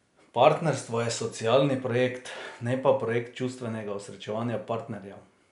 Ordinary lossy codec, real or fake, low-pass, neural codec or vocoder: none; real; 10.8 kHz; none